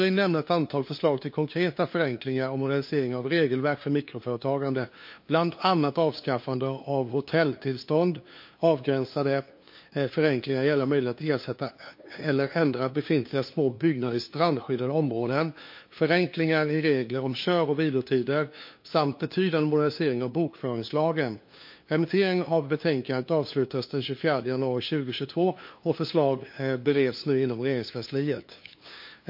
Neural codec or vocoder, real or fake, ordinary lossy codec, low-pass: codec, 16 kHz, 2 kbps, FunCodec, trained on LibriTTS, 25 frames a second; fake; MP3, 32 kbps; 5.4 kHz